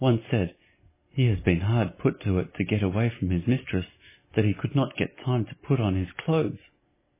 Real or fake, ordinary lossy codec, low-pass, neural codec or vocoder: real; MP3, 16 kbps; 3.6 kHz; none